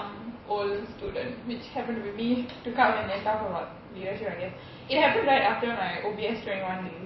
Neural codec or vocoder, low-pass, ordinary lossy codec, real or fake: none; 7.2 kHz; MP3, 24 kbps; real